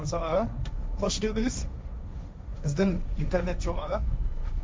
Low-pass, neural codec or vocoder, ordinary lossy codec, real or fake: none; codec, 16 kHz, 1.1 kbps, Voila-Tokenizer; none; fake